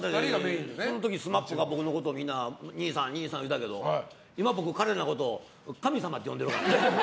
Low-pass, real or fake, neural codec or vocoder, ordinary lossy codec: none; real; none; none